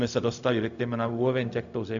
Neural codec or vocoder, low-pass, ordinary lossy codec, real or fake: codec, 16 kHz, 0.4 kbps, LongCat-Audio-Codec; 7.2 kHz; MP3, 96 kbps; fake